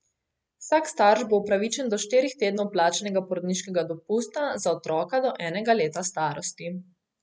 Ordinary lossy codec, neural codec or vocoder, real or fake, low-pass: none; none; real; none